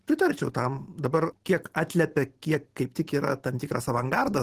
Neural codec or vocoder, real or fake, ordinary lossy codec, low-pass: none; real; Opus, 16 kbps; 14.4 kHz